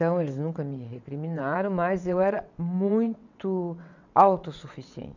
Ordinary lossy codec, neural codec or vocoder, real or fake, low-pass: none; vocoder, 22.05 kHz, 80 mel bands, WaveNeXt; fake; 7.2 kHz